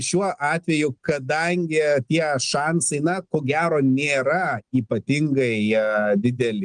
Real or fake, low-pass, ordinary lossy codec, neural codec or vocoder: real; 9.9 kHz; Opus, 24 kbps; none